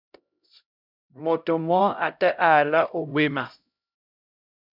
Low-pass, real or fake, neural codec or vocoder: 5.4 kHz; fake; codec, 16 kHz, 0.5 kbps, X-Codec, HuBERT features, trained on LibriSpeech